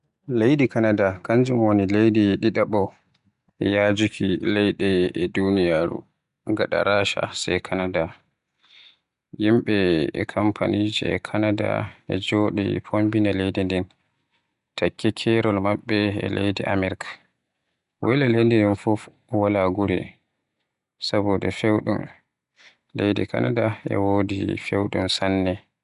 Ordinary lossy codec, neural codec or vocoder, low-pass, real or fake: none; none; 10.8 kHz; real